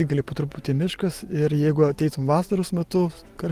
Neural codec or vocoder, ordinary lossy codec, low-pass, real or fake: none; Opus, 24 kbps; 14.4 kHz; real